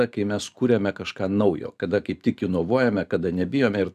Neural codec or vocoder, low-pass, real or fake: vocoder, 44.1 kHz, 128 mel bands every 512 samples, BigVGAN v2; 14.4 kHz; fake